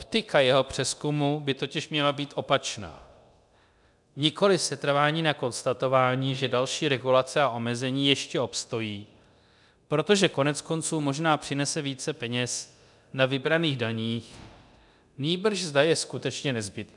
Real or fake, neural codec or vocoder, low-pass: fake; codec, 24 kHz, 0.9 kbps, DualCodec; 10.8 kHz